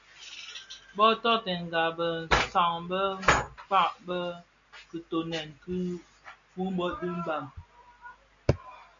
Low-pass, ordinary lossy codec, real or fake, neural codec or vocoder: 7.2 kHz; MP3, 64 kbps; real; none